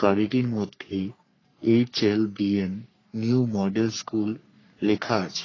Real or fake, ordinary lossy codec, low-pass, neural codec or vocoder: fake; AAC, 32 kbps; 7.2 kHz; codec, 44.1 kHz, 3.4 kbps, Pupu-Codec